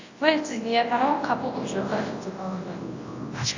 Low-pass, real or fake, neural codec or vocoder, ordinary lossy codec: 7.2 kHz; fake; codec, 24 kHz, 0.9 kbps, WavTokenizer, large speech release; AAC, 48 kbps